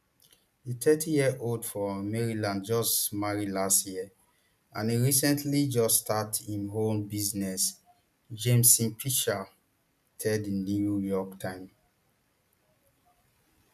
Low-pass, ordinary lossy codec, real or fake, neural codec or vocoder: 14.4 kHz; none; real; none